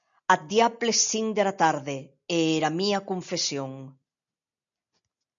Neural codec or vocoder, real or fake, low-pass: none; real; 7.2 kHz